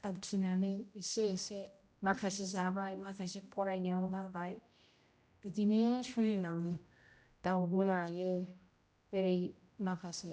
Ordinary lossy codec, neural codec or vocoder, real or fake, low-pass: none; codec, 16 kHz, 0.5 kbps, X-Codec, HuBERT features, trained on general audio; fake; none